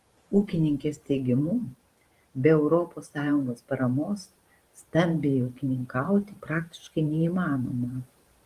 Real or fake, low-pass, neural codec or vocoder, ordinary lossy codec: fake; 14.4 kHz; vocoder, 44.1 kHz, 128 mel bands every 512 samples, BigVGAN v2; Opus, 24 kbps